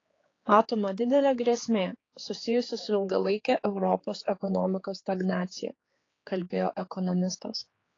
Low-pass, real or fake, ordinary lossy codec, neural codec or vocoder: 7.2 kHz; fake; AAC, 32 kbps; codec, 16 kHz, 4 kbps, X-Codec, HuBERT features, trained on general audio